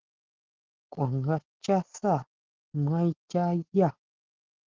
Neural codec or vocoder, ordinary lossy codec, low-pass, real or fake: none; Opus, 16 kbps; 7.2 kHz; real